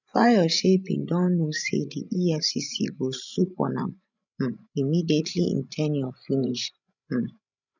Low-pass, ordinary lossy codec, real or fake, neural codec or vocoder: 7.2 kHz; none; fake; codec, 16 kHz, 16 kbps, FreqCodec, larger model